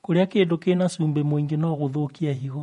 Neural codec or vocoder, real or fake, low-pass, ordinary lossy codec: vocoder, 48 kHz, 128 mel bands, Vocos; fake; 19.8 kHz; MP3, 48 kbps